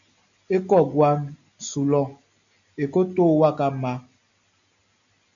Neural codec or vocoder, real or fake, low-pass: none; real; 7.2 kHz